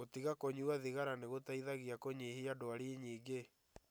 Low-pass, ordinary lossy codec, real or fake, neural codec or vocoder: none; none; real; none